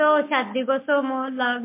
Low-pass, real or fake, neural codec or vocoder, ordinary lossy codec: 3.6 kHz; real; none; MP3, 24 kbps